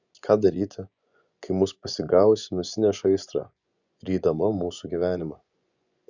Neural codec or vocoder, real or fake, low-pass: vocoder, 24 kHz, 100 mel bands, Vocos; fake; 7.2 kHz